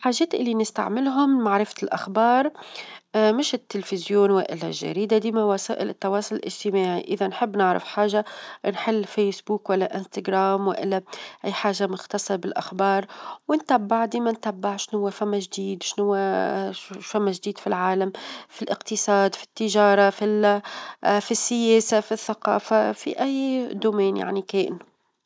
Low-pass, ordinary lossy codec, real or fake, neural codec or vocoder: none; none; real; none